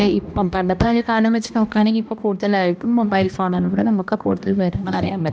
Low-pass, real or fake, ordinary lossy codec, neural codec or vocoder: none; fake; none; codec, 16 kHz, 1 kbps, X-Codec, HuBERT features, trained on balanced general audio